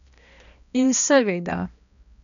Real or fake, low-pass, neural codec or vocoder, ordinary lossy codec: fake; 7.2 kHz; codec, 16 kHz, 1 kbps, X-Codec, HuBERT features, trained on balanced general audio; none